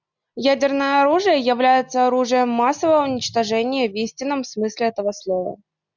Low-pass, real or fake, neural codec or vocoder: 7.2 kHz; real; none